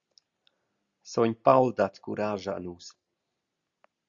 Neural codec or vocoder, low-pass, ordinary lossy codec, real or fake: none; 7.2 kHz; Opus, 64 kbps; real